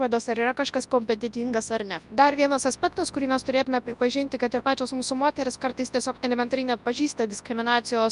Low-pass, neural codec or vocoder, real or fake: 10.8 kHz; codec, 24 kHz, 0.9 kbps, WavTokenizer, large speech release; fake